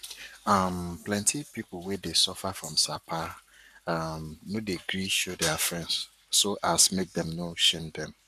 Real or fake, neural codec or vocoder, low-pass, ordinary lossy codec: fake; codec, 44.1 kHz, 7.8 kbps, Pupu-Codec; 14.4 kHz; none